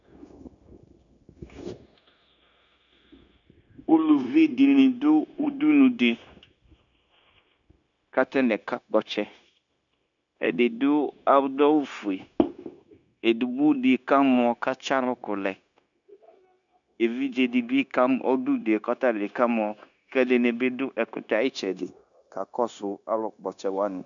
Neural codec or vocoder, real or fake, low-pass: codec, 16 kHz, 0.9 kbps, LongCat-Audio-Codec; fake; 7.2 kHz